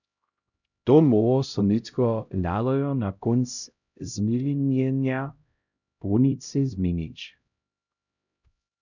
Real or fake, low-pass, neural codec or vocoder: fake; 7.2 kHz; codec, 16 kHz, 0.5 kbps, X-Codec, HuBERT features, trained on LibriSpeech